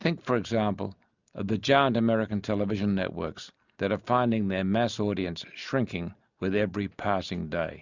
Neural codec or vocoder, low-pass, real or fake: none; 7.2 kHz; real